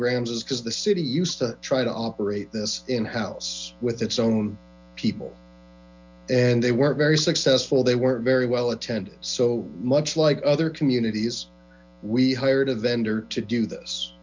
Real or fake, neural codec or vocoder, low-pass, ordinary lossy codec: real; none; 7.2 kHz; MP3, 64 kbps